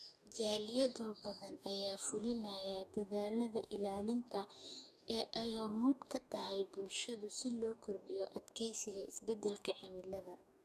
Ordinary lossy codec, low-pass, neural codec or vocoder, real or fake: none; 14.4 kHz; codec, 44.1 kHz, 2.6 kbps, DAC; fake